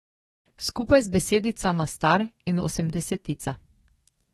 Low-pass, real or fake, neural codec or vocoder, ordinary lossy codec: 14.4 kHz; fake; codec, 32 kHz, 1.9 kbps, SNAC; AAC, 32 kbps